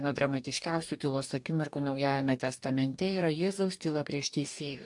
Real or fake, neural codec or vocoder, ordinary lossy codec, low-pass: fake; codec, 44.1 kHz, 2.6 kbps, DAC; AAC, 64 kbps; 10.8 kHz